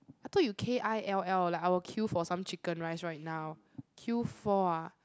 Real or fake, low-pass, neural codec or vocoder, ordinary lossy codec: real; none; none; none